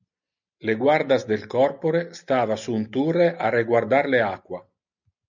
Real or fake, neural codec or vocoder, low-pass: real; none; 7.2 kHz